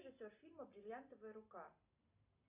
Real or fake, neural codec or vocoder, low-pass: real; none; 3.6 kHz